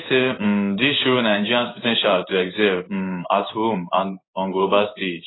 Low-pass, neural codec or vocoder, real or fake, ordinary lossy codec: 7.2 kHz; codec, 16 kHz in and 24 kHz out, 1 kbps, XY-Tokenizer; fake; AAC, 16 kbps